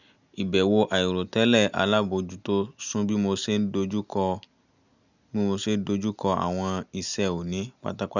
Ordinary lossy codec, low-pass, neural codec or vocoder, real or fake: none; 7.2 kHz; none; real